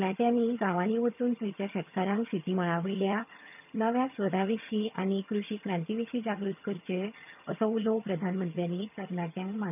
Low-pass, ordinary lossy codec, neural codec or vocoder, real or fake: 3.6 kHz; none; vocoder, 22.05 kHz, 80 mel bands, HiFi-GAN; fake